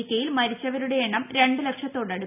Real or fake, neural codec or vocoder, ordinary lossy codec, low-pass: real; none; none; 3.6 kHz